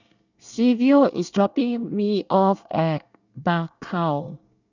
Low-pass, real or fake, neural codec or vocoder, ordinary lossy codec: 7.2 kHz; fake; codec, 24 kHz, 1 kbps, SNAC; none